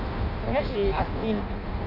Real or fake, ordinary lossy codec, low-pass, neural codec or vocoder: fake; none; 5.4 kHz; codec, 16 kHz in and 24 kHz out, 0.6 kbps, FireRedTTS-2 codec